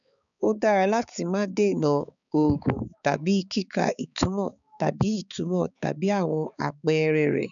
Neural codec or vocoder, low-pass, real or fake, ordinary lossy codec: codec, 16 kHz, 4 kbps, X-Codec, HuBERT features, trained on balanced general audio; 7.2 kHz; fake; none